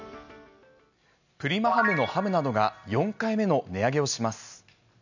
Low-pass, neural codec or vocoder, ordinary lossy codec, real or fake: 7.2 kHz; none; none; real